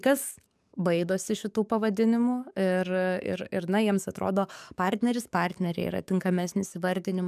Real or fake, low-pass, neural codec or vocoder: fake; 14.4 kHz; codec, 44.1 kHz, 7.8 kbps, DAC